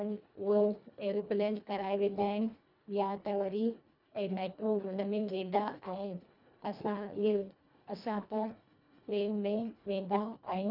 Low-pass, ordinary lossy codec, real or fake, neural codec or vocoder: 5.4 kHz; none; fake; codec, 24 kHz, 1.5 kbps, HILCodec